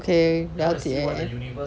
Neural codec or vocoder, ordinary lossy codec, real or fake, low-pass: none; none; real; none